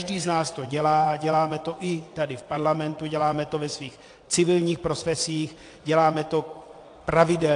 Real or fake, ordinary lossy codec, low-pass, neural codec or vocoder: fake; AAC, 48 kbps; 9.9 kHz; vocoder, 22.05 kHz, 80 mel bands, WaveNeXt